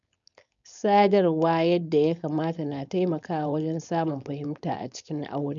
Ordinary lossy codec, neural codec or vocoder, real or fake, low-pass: none; codec, 16 kHz, 4.8 kbps, FACodec; fake; 7.2 kHz